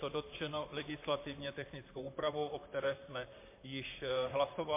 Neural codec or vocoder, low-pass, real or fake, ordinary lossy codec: vocoder, 44.1 kHz, 128 mel bands, Pupu-Vocoder; 3.6 kHz; fake; MP3, 24 kbps